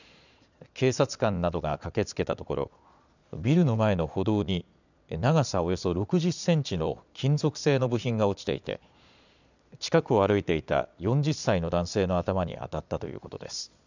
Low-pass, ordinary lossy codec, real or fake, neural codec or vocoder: 7.2 kHz; none; fake; vocoder, 22.05 kHz, 80 mel bands, Vocos